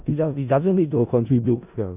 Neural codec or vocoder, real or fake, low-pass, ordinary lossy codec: codec, 16 kHz in and 24 kHz out, 0.4 kbps, LongCat-Audio-Codec, four codebook decoder; fake; 3.6 kHz; none